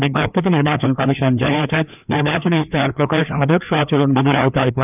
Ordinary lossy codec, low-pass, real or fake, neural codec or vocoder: none; 3.6 kHz; fake; codec, 16 kHz, 2 kbps, FreqCodec, larger model